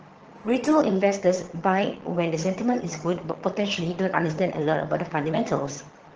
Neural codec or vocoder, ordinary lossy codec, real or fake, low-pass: vocoder, 22.05 kHz, 80 mel bands, HiFi-GAN; Opus, 16 kbps; fake; 7.2 kHz